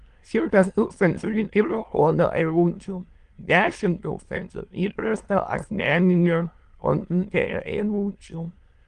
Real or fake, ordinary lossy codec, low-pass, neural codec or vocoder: fake; Opus, 24 kbps; 9.9 kHz; autoencoder, 22.05 kHz, a latent of 192 numbers a frame, VITS, trained on many speakers